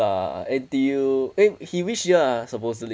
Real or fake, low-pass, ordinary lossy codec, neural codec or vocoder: real; none; none; none